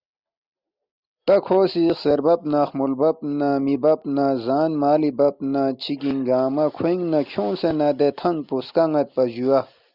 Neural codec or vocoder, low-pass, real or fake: none; 5.4 kHz; real